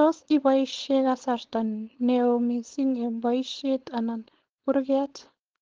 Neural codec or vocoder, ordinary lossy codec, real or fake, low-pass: codec, 16 kHz, 4.8 kbps, FACodec; Opus, 16 kbps; fake; 7.2 kHz